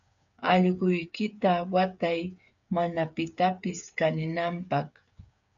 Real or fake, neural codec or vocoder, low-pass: fake; codec, 16 kHz, 8 kbps, FreqCodec, smaller model; 7.2 kHz